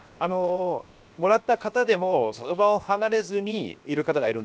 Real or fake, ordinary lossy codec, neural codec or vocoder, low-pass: fake; none; codec, 16 kHz, 0.7 kbps, FocalCodec; none